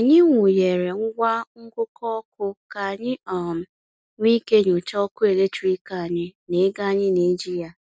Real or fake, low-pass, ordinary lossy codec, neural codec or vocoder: real; none; none; none